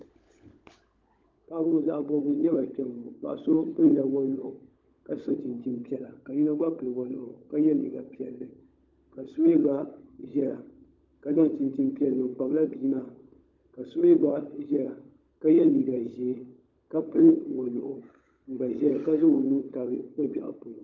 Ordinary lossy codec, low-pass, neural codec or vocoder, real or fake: Opus, 32 kbps; 7.2 kHz; codec, 16 kHz, 16 kbps, FunCodec, trained on LibriTTS, 50 frames a second; fake